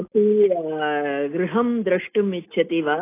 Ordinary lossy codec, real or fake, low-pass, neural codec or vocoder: AAC, 24 kbps; real; 3.6 kHz; none